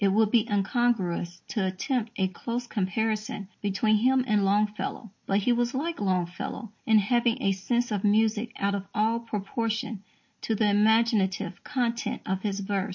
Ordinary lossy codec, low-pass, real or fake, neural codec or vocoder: MP3, 32 kbps; 7.2 kHz; real; none